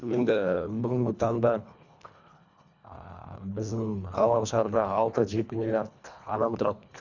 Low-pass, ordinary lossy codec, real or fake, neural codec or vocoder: 7.2 kHz; none; fake; codec, 24 kHz, 1.5 kbps, HILCodec